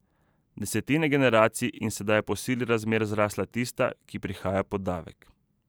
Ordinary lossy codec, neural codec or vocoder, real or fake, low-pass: none; vocoder, 44.1 kHz, 128 mel bands every 512 samples, BigVGAN v2; fake; none